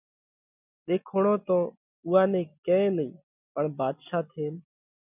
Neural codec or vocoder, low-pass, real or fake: none; 3.6 kHz; real